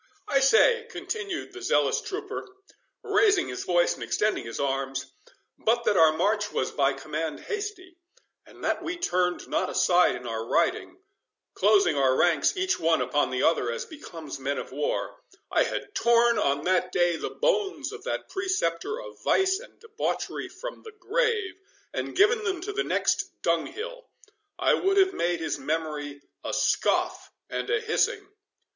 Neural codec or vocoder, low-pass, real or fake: none; 7.2 kHz; real